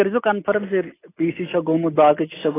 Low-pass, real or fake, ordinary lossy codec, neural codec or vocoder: 3.6 kHz; real; AAC, 16 kbps; none